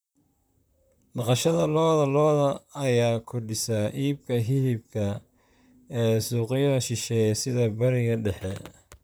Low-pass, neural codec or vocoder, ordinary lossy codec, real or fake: none; vocoder, 44.1 kHz, 128 mel bands, Pupu-Vocoder; none; fake